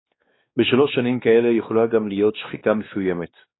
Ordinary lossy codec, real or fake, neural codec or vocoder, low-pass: AAC, 16 kbps; fake; codec, 16 kHz, 2 kbps, X-Codec, WavLM features, trained on Multilingual LibriSpeech; 7.2 kHz